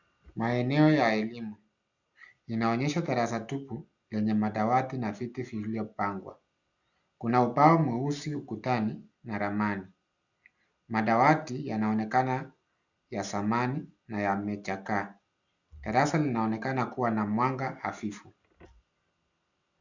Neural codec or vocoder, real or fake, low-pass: none; real; 7.2 kHz